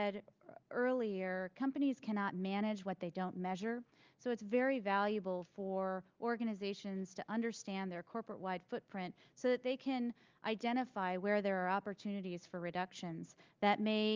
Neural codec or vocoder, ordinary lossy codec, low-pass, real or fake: none; Opus, 24 kbps; 7.2 kHz; real